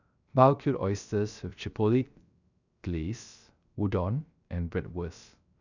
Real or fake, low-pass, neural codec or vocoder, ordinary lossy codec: fake; 7.2 kHz; codec, 16 kHz, 0.3 kbps, FocalCodec; none